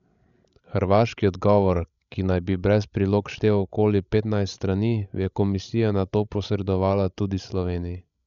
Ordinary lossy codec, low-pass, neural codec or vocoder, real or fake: none; 7.2 kHz; codec, 16 kHz, 16 kbps, FreqCodec, larger model; fake